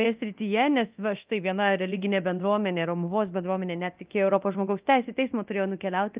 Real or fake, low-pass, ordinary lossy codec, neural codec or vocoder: fake; 3.6 kHz; Opus, 32 kbps; codec, 24 kHz, 0.9 kbps, DualCodec